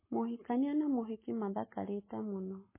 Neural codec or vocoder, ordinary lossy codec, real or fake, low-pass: codec, 44.1 kHz, 7.8 kbps, Pupu-Codec; MP3, 16 kbps; fake; 3.6 kHz